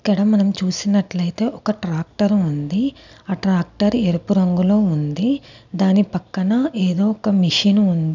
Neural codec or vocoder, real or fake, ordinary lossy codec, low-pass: none; real; none; 7.2 kHz